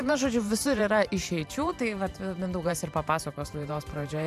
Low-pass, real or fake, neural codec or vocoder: 14.4 kHz; fake; vocoder, 44.1 kHz, 128 mel bands every 512 samples, BigVGAN v2